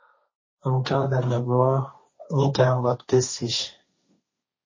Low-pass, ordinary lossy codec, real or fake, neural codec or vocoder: 7.2 kHz; MP3, 32 kbps; fake; codec, 16 kHz, 1.1 kbps, Voila-Tokenizer